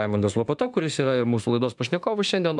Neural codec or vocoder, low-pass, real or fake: autoencoder, 48 kHz, 32 numbers a frame, DAC-VAE, trained on Japanese speech; 10.8 kHz; fake